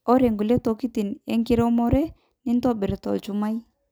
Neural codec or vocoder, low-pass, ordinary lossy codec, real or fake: none; none; none; real